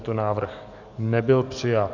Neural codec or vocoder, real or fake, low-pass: codec, 44.1 kHz, 7.8 kbps, DAC; fake; 7.2 kHz